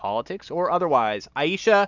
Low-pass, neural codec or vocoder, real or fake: 7.2 kHz; none; real